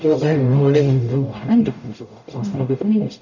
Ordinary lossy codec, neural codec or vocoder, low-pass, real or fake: none; codec, 44.1 kHz, 0.9 kbps, DAC; 7.2 kHz; fake